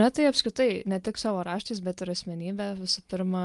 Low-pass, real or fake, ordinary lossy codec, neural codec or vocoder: 10.8 kHz; real; Opus, 32 kbps; none